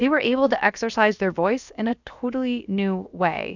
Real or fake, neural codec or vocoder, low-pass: fake; codec, 16 kHz, about 1 kbps, DyCAST, with the encoder's durations; 7.2 kHz